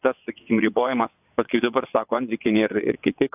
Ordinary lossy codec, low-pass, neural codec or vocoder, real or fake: AAC, 32 kbps; 3.6 kHz; vocoder, 22.05 kHz, 80 mel bands, WaveNeXt; fake